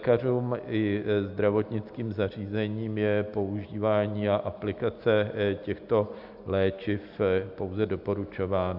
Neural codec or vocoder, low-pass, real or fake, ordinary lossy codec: none; 5.4 kHz; real; AAC, 48 kbps